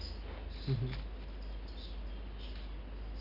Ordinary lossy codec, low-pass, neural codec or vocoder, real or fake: none; 5.4 kHz; none; real